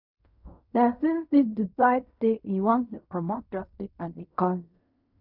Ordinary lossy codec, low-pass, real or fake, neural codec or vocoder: none; 5.4 kHz; fake; codec, 16 kHz in and 24 kHz out, 0.4 kbps, LongCat-Audio-Codec, fine tuned four codebook decoder